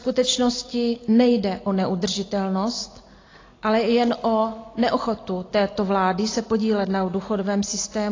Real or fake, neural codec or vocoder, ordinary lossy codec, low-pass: real; none; AAC, 32 kbps; 7.2 kHz